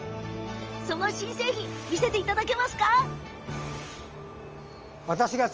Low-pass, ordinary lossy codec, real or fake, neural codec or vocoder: 7.2 kHz; Opus, 24 kbps; real; none